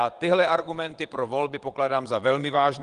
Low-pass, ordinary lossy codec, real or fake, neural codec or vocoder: 9.9 kHz; Opus, 24 kbps; fake; vocoder, 22.05 kHz, 80 mel bands, WaveNeXt